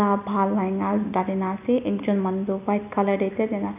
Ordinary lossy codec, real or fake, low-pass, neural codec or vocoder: none; real; 3.6 kHz; none